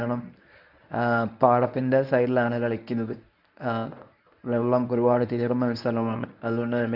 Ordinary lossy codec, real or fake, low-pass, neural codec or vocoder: none; fake; 5.4 kHz; codec, 24 kHz, 0.9 kbps, WavTokenizer, medium speech release version 1